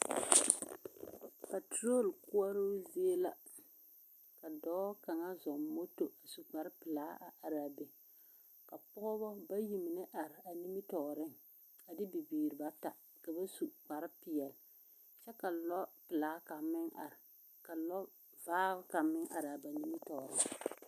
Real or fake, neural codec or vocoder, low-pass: real; none; 14.4 kHz